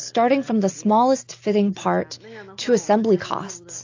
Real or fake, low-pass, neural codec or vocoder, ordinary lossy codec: real; 7.2 kHz; none; AAC, 48 kbps